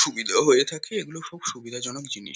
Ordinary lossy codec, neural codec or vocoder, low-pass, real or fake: none; none; none; real